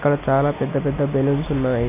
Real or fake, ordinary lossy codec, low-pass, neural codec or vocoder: real; none; 3.6 kHz; none